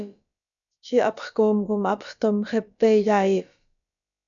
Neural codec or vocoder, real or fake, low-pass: codec, 16 kHz, about 1 kbps, DyCAST, with the encoder's durations; fake; 7.2 kHz